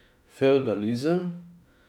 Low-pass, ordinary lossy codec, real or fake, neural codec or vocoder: 19.8 kHz; none; fake; autoencoder, 48 kHz, 32 numbers a frame, DAC-VAE, trained on Japanese speech